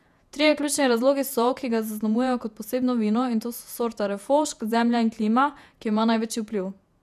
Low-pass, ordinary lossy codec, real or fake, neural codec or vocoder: 14.4 kHz; none; fake; vocoder, 48 kHz, 128 mel bands, Vocos